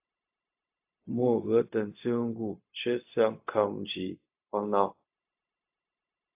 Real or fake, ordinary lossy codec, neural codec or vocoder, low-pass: fake; AAC, 32 kbps; codec, 16 kHz, 0.4 kbps, LongCat-Audio-Codec; 3.6 kHz